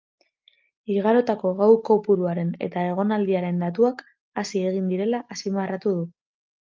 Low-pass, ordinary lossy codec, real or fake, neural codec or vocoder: 7.2 kHz; Opus, 24 kbps; real; none